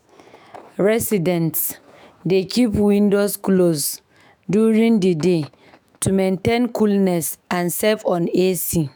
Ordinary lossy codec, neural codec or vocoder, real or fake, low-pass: none; autoencoder, 48 kHz, 128 numbers a frame, DAC-VAE, trained on Japanese speech; fake; none